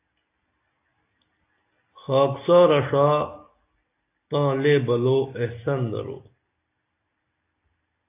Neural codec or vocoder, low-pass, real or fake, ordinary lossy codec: none; 3.6 kHz; real; AAC, 24 kbps